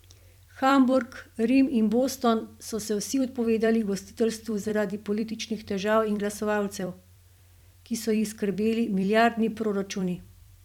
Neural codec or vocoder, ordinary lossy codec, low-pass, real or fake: vocoder, 44.1 kHz, 128 mel bands every 256 samples, BigVGAN v2; none; 19.8 kHz; fake